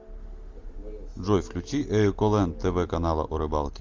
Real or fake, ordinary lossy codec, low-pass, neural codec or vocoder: real; Opus, 32 kbps; 7.2 kHz; none